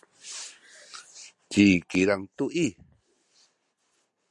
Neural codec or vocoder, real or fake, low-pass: none; real; 10.8 kHz